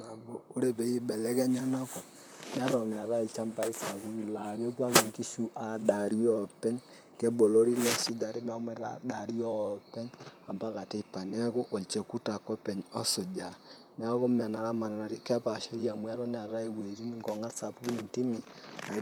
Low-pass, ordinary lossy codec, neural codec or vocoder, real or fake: none; none; vocoder, 44.1 kHz, 128 mel bands, Pupu-Vocoder; fake